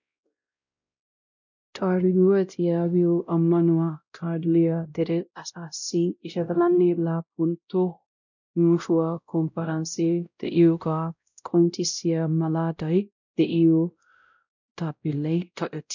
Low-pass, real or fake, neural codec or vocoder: 7.2 kHz; fake; codec, 16 kHz, 0.5 kbps, X-Codec, WavLM features, trained on Multilingual LibriSpeech